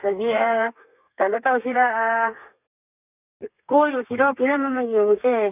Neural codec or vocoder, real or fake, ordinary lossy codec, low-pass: codec, 32 kHz, 1.9 kbps, SNAC; fake; none; 3.6 kHz